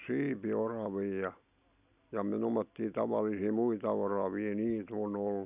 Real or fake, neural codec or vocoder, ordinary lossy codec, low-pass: real; none; none; 3.6 kHz